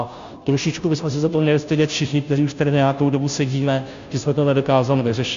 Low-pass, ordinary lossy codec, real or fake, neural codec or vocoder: 7.2 kHz; MP3, 64 kbps; fake; codec, 16 kHz, 0.5 kbps, FunCodec, trained on Chinese and English, 25 frames a second